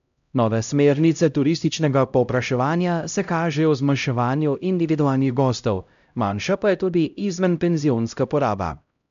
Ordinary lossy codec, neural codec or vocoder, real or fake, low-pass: none; codec, 16 kHz, 0.5 kbps, X-Codec, HuBERT features, trained on LibriSpeech; fake; 7.2 kHz